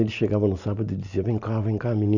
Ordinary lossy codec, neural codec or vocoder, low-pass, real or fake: none; none; 7.2 kHz; real